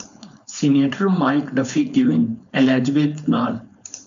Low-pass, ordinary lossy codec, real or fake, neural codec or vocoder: 7.2 kHz; MP3, 64 kbps; fake; codec, 16 kHz, 4.8 kbps, FACodec